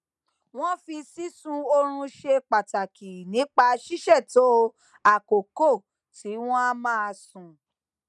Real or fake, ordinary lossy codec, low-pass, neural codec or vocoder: real; none; none; none